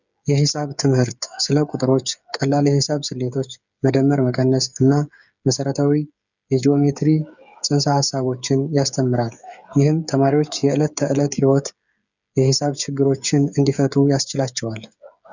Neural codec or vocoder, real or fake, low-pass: codec, 16 kHz, 8 kbps, FreqCodec, smaller model; fake; 7.2 kHz